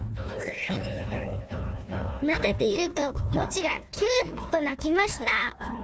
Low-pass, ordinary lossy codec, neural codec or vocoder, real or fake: none; none; codec, 16 kHz, 1 kbps, FunCodec, trained on Chinese and English, 50 frames a second; fake